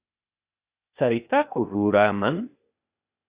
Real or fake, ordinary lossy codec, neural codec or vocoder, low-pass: fake; Opus, 64 kbps; codec, 16 kHz, 0.8 kbps, ZipCodec; 3.6 kHz